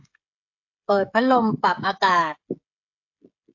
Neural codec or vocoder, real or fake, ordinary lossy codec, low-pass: codec, 16 kHz, 16 kbps, FreqCodec, smaller model; fake; AAC, 48 kbps; 7.2 kHz